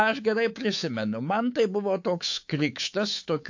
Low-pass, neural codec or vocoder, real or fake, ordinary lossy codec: 7.2 kHz; codec, 24 kHz, 3.1 kbps, DualCodec; fake; AAC, 48 kbps